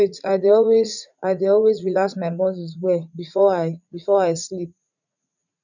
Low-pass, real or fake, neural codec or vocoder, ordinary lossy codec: 7.2 kHz; fake; vocoder, 44.1 kHz, 80 mel bands, Vocos; none